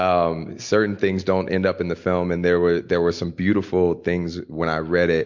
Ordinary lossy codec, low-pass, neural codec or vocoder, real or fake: MP3, 48 kbps; 7.2 kHz; none; real